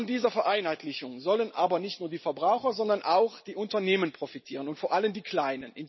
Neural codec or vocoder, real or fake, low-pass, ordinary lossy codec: none; real; 7.2 kHz; MP3, 24 kbps